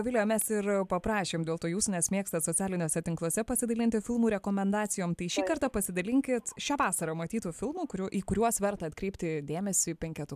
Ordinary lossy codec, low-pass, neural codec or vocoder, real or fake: AAC, 96 kbps; 14.4 kHz; none; real